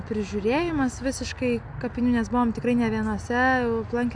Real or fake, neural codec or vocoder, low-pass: real; none; 9.9 kHz